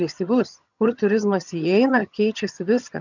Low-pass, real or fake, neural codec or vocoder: 7.2 kHz; fake; vocoder, 22.05 kHz, 80 mel bands, HiFi-GAN